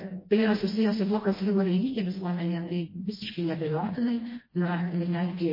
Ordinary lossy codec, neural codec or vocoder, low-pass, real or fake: MP3, 24 kbps; codec, 16 kHz, 1 kbps, FreqCodec, smaller model; 5.4 kHz; fake